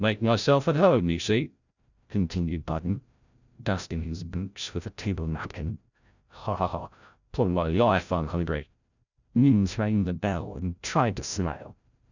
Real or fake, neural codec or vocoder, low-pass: fake; codec, 16 kHz, 0.5 kbps, FreqCodec, larger model; 7.2 kHz